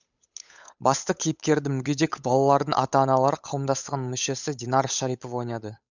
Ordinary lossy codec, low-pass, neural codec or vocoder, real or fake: none; 7.2 kHz; codec, 16 kHz, 8 kbps, FunCodec, trained on Chinese and English, 25 frames a second; fake